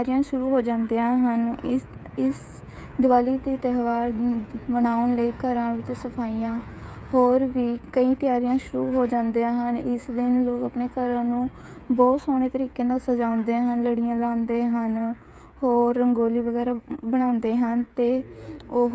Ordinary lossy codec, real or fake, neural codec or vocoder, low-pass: none; fake; codec, 16 kHz, 8 kbps, FreqCodec, smaller model; none